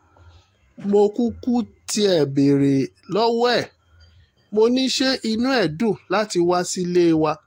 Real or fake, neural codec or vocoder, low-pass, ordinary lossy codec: fake; autoencoder, 48 kHz, 128 numbers a frame, DAC-VAE, trained on Japanese speech; 19.8 kHz; AAC, 48 kbps